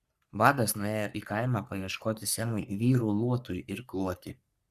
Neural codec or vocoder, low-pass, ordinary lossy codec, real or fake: codec, 44.1 kHz, 3.4 kbps, Pupu-Codec; 14.4 kHz; Opus, 64 kbps; fake